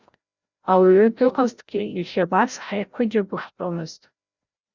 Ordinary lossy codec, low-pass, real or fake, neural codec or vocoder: Opus, 64 kbps; 7.2 kHz; fake; codec, 16 kHz, 0.5 kbps, FreqCodec, larger model